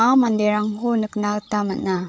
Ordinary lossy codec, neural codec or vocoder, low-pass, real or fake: none; codec, 16 kHz, 8 kbps, FreqCodec, larger model; none; fake